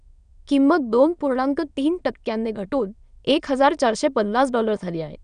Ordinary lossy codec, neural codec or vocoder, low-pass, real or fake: none; autoencoder, 22.05 kHz, a latent of 192 numbers a frame, VITS, trained on many speakers; 9.9 kHz; fake